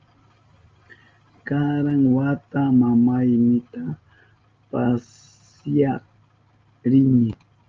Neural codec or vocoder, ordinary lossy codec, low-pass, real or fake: none; Opus, 32 kbps; 7.2 kHz; real